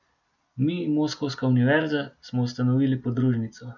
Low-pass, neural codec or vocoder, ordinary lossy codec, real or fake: 7.2 kHz; none; none; real